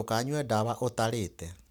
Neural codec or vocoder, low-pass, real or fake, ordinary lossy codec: none; none; real; none